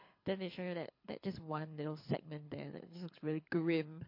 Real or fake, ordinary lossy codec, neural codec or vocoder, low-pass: fake; MP3, 32 kbps; codec, 24 kHz, 6 kbps, HILCodec; 5.4 kHz